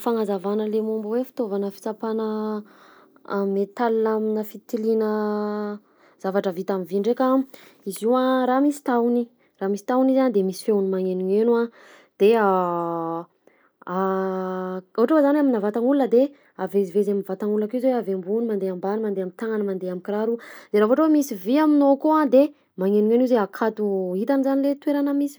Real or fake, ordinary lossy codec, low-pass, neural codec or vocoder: real; none; none; none